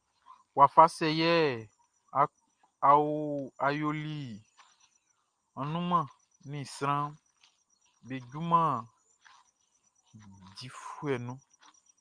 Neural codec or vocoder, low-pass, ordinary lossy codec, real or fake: none; 9.9 kHz; Opus, 32 kbps; real